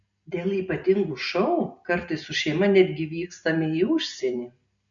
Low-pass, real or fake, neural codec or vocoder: 7.2 kHz; real; none